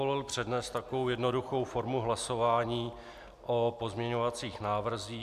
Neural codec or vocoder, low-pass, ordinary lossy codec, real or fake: none; 14.4 kHz; MP3, 96 kbps; real